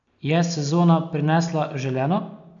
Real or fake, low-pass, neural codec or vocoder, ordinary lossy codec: real; 7.2 kHz; none; MP3, 64 kbps